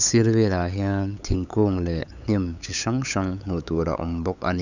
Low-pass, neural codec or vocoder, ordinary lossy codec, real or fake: 7.2 kHz; codec, 16 kHz, 8 kbps, FunCodec, trained on LibriTTS, 25 frames a second; none; fake